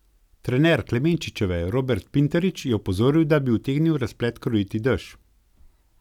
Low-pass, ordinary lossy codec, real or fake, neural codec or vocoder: 19.8 kHz; none; real; none